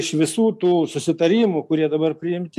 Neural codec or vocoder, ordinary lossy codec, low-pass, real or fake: none; AAC, 96 kbps; 14.4 kHz; real